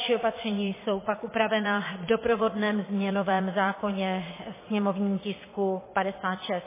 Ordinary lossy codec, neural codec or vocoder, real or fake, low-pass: MP3, 16 kbps; vocoder, 22.05 kHz, 80 mel bands, Vocos; fake; 3.6 kHz